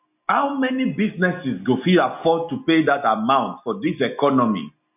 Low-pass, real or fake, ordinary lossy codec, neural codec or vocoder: 3.6 kHz; real; none; none